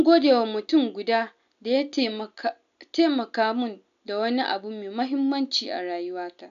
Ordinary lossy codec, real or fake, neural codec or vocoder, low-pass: none; real; none; 7.2 kHz